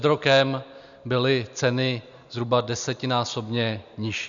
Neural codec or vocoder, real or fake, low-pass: none; real; 7.2 kHz